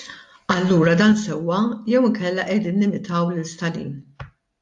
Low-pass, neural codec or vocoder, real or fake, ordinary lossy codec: 10.8 kHz; none; real; AAC, 64 kbps